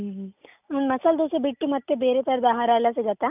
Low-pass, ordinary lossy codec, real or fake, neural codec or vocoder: 3.6 kHz; none; real; none